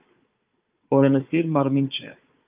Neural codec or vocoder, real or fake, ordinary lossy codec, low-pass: codec, 16 kHz, 4 kbps, FunCodec, trained on Chinese and English, 50 frames a second; fake; Opus, 24 kbps; 3.6 kHz